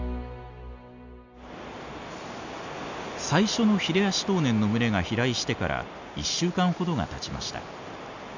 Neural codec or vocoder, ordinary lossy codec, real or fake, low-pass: none; none; real; 7.2 kHz